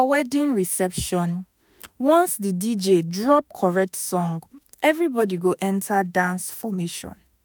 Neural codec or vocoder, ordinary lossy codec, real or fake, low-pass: autoencoder, 48 kHz, 32 numbers a frame, DAC-VAE, trained on Japanese speech; none; fake; none